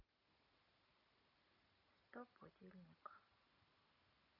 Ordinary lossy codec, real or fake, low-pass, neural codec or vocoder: none; real; 5.4 kHz; none